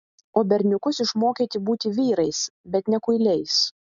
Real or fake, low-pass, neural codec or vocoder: real; 7.2 kHz; none